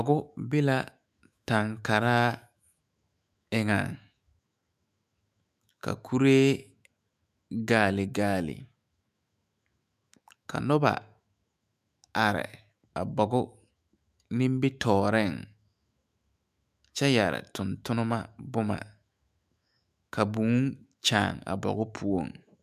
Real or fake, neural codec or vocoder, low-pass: fake; autoencoder, 48 kHz, 128 numbers a frame, DAC-VAE, trained on Japanese speech; 14.4 kHz